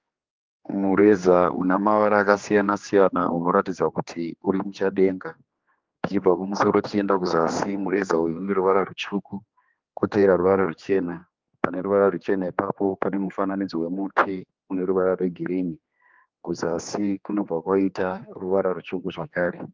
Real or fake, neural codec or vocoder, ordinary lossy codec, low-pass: fake; codec, 16 kHz, 2 kbps, X-Codec, HuBERT features, trained on general audio; Opus, 24 kbps; 7.2 kHz